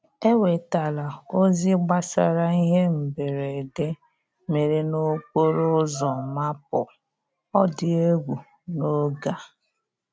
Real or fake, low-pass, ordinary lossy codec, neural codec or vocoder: real; none; none; none